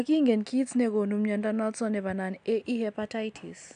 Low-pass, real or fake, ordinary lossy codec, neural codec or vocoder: 9.9 kHz; real; AAC, 96 kbps; none